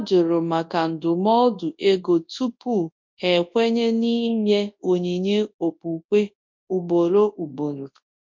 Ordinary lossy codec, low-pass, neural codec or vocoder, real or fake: MP3, 48 kbps; 7.2 kHz; codec, 24 kHz, 0.9 kbps, WavTokenizer, large speech release; fake